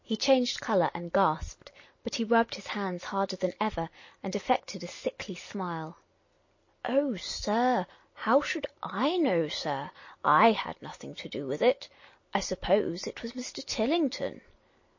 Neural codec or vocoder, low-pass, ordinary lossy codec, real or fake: none; 7.2 kHz; MP3, 32 kbps; real